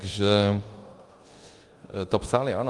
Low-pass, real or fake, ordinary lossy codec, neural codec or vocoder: 10.8 kHz; fake; Opus, 24 kbps; codec, 24 kHz, 0.9 kbps, DualCodec